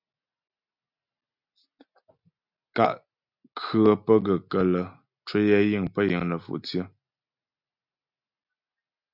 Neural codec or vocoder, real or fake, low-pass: none; real; 5.4 kHz